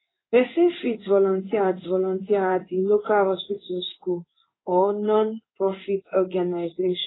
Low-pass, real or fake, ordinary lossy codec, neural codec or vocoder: 7.2 kHz; fake; AAC, 16 kbps; codec, 16 kHz in and 24 kHz out, 1 kbps, XY-Tokenizer